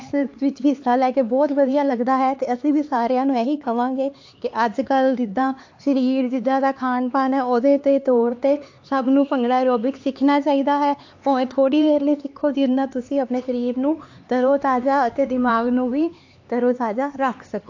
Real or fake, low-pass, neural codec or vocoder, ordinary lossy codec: fake; 7.2 kHz; codec, 16 kHz, 4 kbps, X-Codec, HuBERT features, trained on LibriSpeech; AAC, 48 kbps